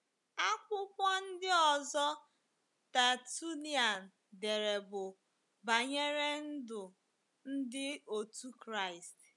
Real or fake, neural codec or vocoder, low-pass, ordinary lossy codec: real; none; 10.8 kHz; none